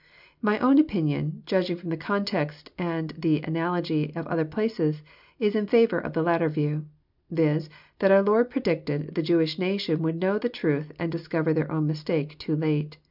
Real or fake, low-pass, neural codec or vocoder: real; 5.4 kHz; none